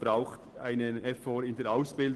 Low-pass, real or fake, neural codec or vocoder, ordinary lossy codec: 10.8 kHz; real; none; Opus, 16 kbps